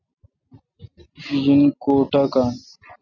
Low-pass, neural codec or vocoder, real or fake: 7.2 kHz; none; real